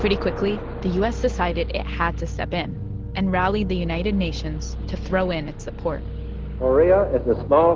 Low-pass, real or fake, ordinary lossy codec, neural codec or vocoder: 7.2 kHz; real; Opus, 16 kbps; none